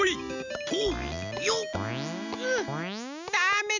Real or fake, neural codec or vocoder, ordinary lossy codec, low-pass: real; none; none; 7.2 kHz